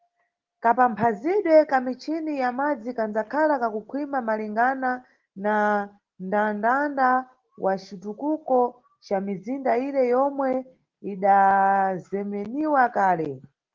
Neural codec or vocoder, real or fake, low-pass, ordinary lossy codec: none; real; 7.2 kHz; Opus, 16 kbps